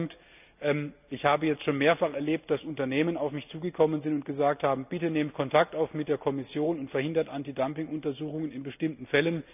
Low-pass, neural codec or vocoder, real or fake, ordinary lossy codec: 3.6 kHz; none; real; none